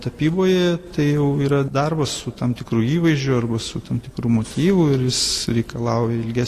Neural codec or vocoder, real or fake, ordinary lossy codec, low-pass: none; real; AAC, 48 kbps; 14.4 kHz